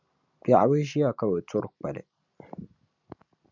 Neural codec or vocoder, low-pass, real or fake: none; 7.2 kHz; real